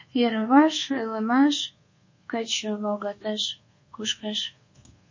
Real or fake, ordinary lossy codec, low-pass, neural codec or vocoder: fake; MP3, 32 kbps; 7.2 kHz; codec, 24 kHz, 1.2 kbps, DualCodec